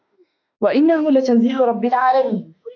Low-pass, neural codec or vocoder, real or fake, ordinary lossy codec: 7.2 kHz; autoencoder, 48 kHz, 32 numbers a frame, DAC-VAE, trained on Japanese speech; fake; AAC, 48 kbps